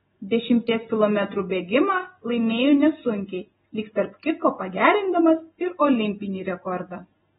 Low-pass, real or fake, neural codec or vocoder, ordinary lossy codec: 19.8 kHz; real; none; AAC, 16 kbps